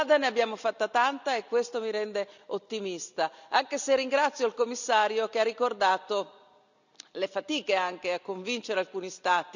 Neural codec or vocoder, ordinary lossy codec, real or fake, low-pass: none; none; real; 7.2 kHz